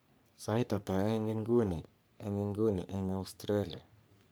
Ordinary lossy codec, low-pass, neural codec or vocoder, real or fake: none; none; codec, 44.1 kHz, 3.4 kbps, Pupu-Codec; fake